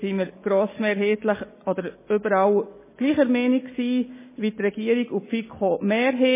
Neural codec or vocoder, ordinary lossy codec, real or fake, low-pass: none; MP3, 16 kbps; real; 3.6 kHz